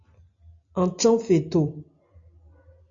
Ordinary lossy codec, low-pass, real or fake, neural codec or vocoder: AAC, 48 kbps; 7.2 kHz; real; none